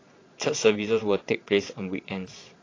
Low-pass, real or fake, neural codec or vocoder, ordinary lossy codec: 7.2 kHz; fake; vocoder, 22.05 kHz, 80 mel bands, Vocos; AAC, 32 kbps